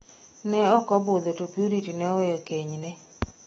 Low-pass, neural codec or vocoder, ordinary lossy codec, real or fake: 19.8 kHz; autoencoder, 48 kHz, 128 numbers a frame, DAC-VAE, trained on Japanese speech; AAC, 24 kbps; fake